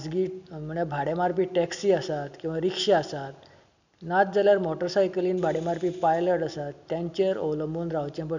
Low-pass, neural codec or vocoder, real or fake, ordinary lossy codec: 7.2 kHz; none; real; none